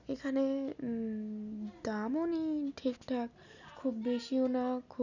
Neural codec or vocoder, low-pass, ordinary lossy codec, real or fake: none; 7.2 kHz; none; real